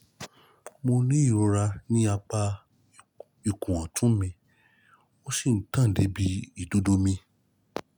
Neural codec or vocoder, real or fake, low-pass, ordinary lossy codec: none; real; 19.8 kHz; none